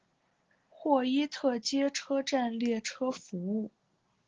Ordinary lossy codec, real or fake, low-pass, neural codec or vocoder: Opus, 16 kbps; real; 7.2 kHz; none